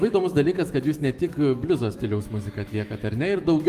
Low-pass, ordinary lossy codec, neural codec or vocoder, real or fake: 14.4 kHz; Opus, 32 kbps; autoencoder, 48 kHz, 128 numbers a frame, DAC-VAE, trained on Japanese speech; fake